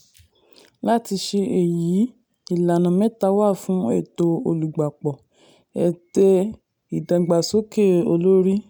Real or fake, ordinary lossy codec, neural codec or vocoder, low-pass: real; none; none; none